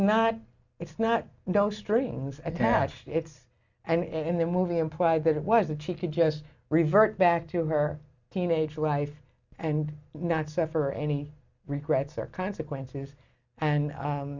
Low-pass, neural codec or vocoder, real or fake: 7.2 kHz; none; real